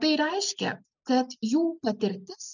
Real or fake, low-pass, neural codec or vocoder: real; 7.2 kHz; none